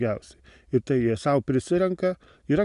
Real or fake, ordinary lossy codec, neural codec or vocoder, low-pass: real; AAC, 96 kbps; none; 10.8 kHz